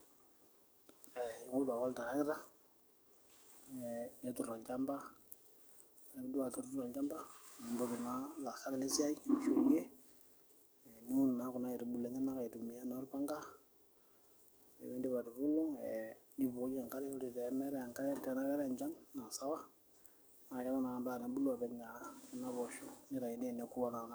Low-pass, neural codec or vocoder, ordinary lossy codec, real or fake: none; codec, 44.1 kHz, 7.8 kbps, DAC; none; fake